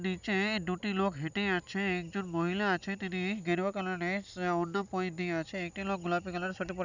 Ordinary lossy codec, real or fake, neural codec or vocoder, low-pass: none; real; none; 7.2 kHz